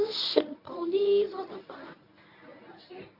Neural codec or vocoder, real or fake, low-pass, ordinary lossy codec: codec, 24 kHz, 0.9 kbps, WavTokenizer, medium speech release version 1; fake; 5.4 kHz; none